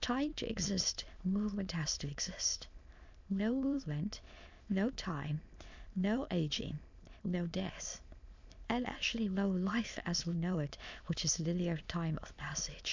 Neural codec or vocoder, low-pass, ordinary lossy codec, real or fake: autoencoder, 22.05 kHz, a latent of 192 numbers a frame, VITS, trained on many speakers; 7.2 kHz; MP3, 64 kbps; fake